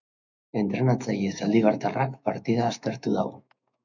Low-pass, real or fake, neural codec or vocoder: 7.2 kHz; fake; autoencoder, 48 kHz, 128 numbers a frame, DAC-VAE, trained on Japanese speech